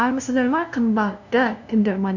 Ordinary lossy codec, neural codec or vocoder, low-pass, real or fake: none; codec, 16 kHz, 0.5 kbps, FunCodec, trained on LibriTTS, 25 frames a second; 7.2 kHz; fake